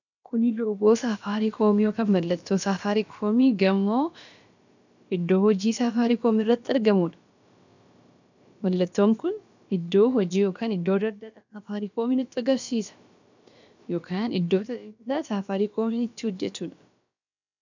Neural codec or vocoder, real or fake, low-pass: codec, 16 kHz, about 1 kbps, DyCAST, with the encoder's durations; fake; 7.2 kHz